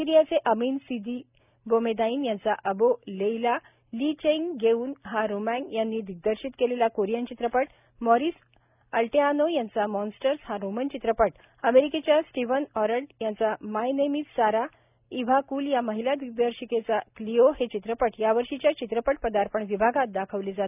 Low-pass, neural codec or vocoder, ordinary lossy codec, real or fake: 3.6 kHz; none; none; real